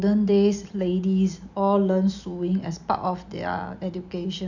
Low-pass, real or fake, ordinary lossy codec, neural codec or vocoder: 7.2 kHz; real; none; none